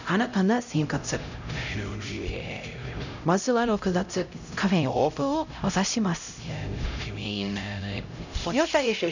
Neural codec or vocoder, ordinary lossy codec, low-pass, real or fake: codec, 16 kHz, 0.5 kbps, X-Codec, HuBERT features, trained on LibriSpeech; none; 7.2 kHz; fake